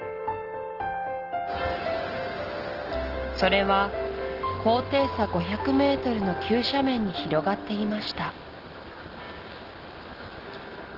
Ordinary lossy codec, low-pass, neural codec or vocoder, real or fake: Opus, 16 kbps; 5.4 kHz; none; real